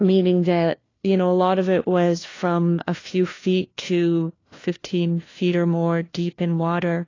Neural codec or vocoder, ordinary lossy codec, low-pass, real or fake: codec, 16 kHz, 1 kbps, FunCodec, trained on LibriTTS, 50 frames a second; AAC, 32 kbps; 7.2 kHz; fake